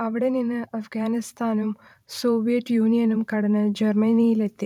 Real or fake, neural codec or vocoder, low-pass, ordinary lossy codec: fake; vocoder, 44.1 kHz, 128 mel bands every 512 samples, BigVGAN v2; 19.8 kHz; none